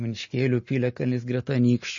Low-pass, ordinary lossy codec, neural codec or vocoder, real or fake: 7.2 kHz; MP3, 32 kbps; none; real